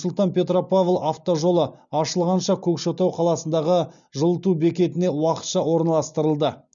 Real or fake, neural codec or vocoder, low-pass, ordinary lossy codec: real; none; 7.2 kHz; none